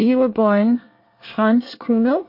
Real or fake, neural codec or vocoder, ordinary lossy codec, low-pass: fake; codec, 24 kHz, 1 kbps, SNAC; MP3, 32 kbps; 5.4 kHz